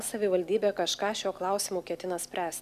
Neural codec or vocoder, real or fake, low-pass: none; real; 14.4 kHz